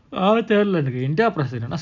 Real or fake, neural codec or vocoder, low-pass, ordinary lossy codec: real; none; 7.2 kHz; none